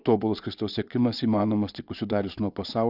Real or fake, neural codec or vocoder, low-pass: fake; vocoder, 22.05 kHz, 80 mel bands, Vocos; 5.4 kHz